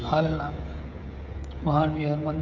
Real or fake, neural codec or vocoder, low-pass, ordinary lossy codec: fake; codec, 16 kHz, 16 kbps, FreqCodec, smaller model; 7.2 kHz; none